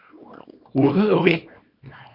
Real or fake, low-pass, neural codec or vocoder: fake; 5.4 kHz; codec, 24 kHz, 0.9 kbps, WavTokenizer, small release